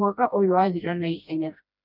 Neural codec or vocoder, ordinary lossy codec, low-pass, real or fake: codec, 16 kHz, 1 kbps, FreqCodec, smaller model; none; 5.4 kHz; fake